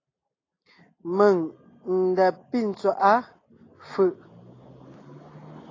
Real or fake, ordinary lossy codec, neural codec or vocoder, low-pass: real; AAC, 32 kbps; none; 7.2 kHz